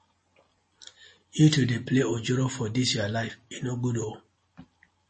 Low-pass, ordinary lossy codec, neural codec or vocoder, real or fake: 9.9 kHz; MP3, 32 kbps; none; real